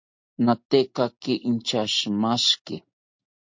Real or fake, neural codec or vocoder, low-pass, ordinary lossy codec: real; none; 7.2 kHz; MP3, 48 kbps